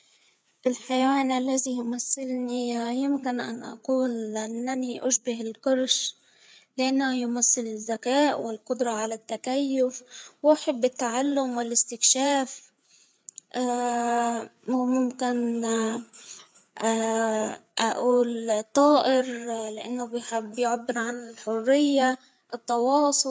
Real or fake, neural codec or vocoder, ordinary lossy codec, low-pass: fake; codec, 16 kHz, 4 kbps, FreqCodec, larger model; none; none